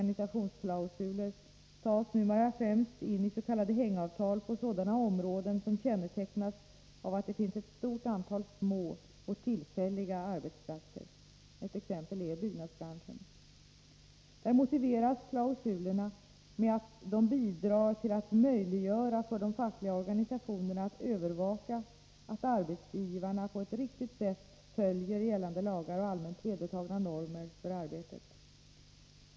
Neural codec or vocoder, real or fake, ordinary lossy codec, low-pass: none; real; none; none